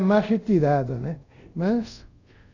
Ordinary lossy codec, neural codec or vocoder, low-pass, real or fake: none; codec, 24 kHz, 0.5 kbps, DualCodec; 7.2 kHz; fake